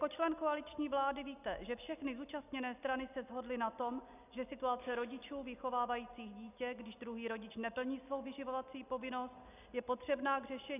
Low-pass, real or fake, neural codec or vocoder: 3.6 kHz; real; none